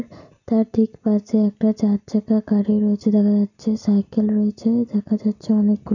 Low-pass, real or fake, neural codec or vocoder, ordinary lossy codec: 7.2 kHz; real; none; none